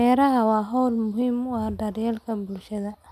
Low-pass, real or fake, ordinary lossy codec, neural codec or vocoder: 14.4 kHz; real; none; none